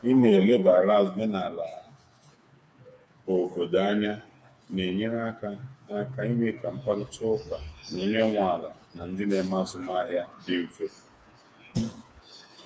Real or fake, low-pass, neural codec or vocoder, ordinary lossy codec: fake; none; codec, 16 kHz, 4 kbps, FreqCodec, smaller model; none